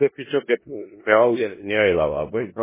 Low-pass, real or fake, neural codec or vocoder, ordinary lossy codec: 3.6 kHz; fake; codec, 16 kHz in and 24 kHz out, 0.4 kbps, LongCat-Audio-Codec, four codebook decoder; MP3, 16 kbps